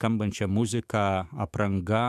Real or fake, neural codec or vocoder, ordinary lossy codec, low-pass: fake; autoencoder, 48 kHz, 128 numbers a frame, DAC-VAE, trained on Japanese speech; MP3, 96 kbps; 14.4 kHz